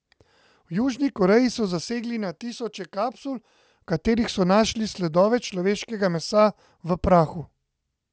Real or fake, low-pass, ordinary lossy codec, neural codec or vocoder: real; none; none; none